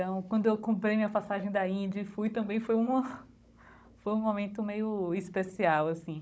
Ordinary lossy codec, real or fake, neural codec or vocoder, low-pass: none; fake; codec, 16 kHz, 16 kbps, FunCodec, trained on Chinese and English, 50 frames a second; none